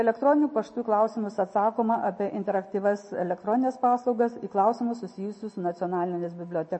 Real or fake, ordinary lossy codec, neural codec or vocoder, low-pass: real; MP3, 32 kbps; none; 9.9 kHz